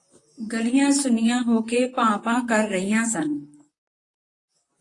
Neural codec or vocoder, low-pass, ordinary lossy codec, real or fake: vocoder, 44.1 kHz, 128 mel bands, Pupu-Vocoder; 10.8 kHz; AAC, 32 kbps; fake